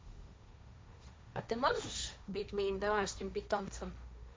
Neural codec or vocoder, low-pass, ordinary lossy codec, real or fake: codec, 16 kHz, 1.1 kbps, Voila-Tokenizer; none; none; fake